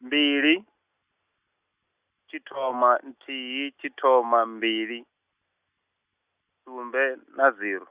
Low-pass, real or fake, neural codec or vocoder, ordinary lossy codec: 3.6 kHz; real; none; Opus, 64 kbps